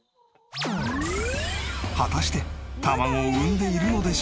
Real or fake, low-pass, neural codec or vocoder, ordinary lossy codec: real; none; none; none